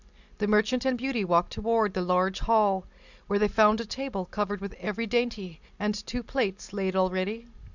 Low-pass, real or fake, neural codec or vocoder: 7.2 kHz; real; none